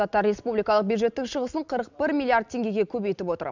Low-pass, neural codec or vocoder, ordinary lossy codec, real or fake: 7.2 kHz; none; none; real